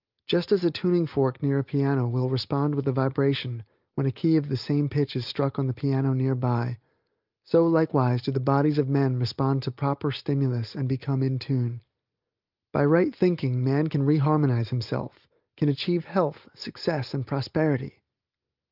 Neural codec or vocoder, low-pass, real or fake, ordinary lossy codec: none; 5.4 kHz; real; Opus, 24 kbps